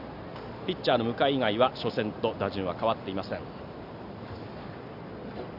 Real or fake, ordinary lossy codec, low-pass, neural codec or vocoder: real; none; 5.4 kHz; none